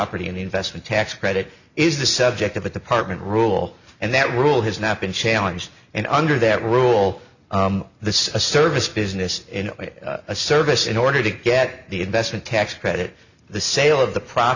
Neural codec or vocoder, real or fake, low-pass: none; real; 7.2 kHz